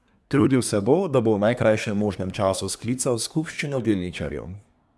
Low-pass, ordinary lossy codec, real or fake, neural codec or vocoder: none; none; fake; codec, 24 kHz, 1 kbps, SNAC